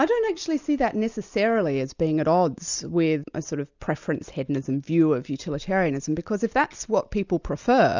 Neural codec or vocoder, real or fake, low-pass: none; real; 7.2 kHz